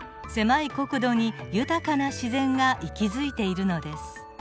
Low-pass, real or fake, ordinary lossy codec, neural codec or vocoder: none; real; none; none